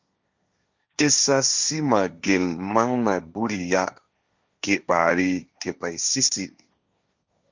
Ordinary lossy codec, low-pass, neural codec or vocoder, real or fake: Opus, 64 kbps; 7.2 kHz; codec, 16 kHz, 1.1 kbps, Voila-Tokenizer; fake